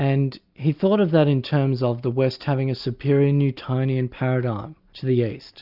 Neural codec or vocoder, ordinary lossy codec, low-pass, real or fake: none; Opus, 64 kbps; 5.4 kHz; real